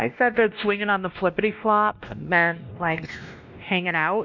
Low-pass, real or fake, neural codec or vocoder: 7.2 kHz; fake; codec, 16 kHz, 1 kbps, X-Codec, WavLM features, trained on Multilingual LibriSpeech